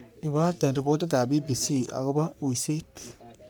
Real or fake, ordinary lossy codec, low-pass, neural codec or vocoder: fake; none; none; codec, 44.1 kHz, 3.4 kbps, Pupu-Codec